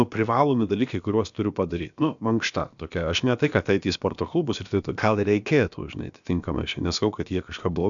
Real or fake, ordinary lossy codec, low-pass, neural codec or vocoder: fake; MP3, 96 kbps; 7.2 kHz; codec, 16 kHz, about 1 kbps, DyCAST, with the encoder's durations